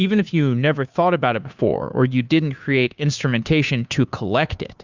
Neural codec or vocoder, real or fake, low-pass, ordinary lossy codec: codec, 16 kHz, 2 kbps, FunCodec, trained on Chinese and English, 25 frames a second; fake; 7.2 kHz; Opus, 64 kbps